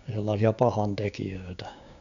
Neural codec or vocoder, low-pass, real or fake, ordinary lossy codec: codec, 16 kHz, 6 kbps, DAC; 7.2 kHz; fake; none